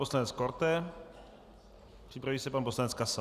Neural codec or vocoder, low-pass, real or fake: none; 14.4 kHz; real